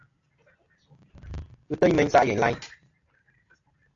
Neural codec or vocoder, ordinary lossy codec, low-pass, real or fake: none; AAC, 48 kbps; 7.2 kHz; real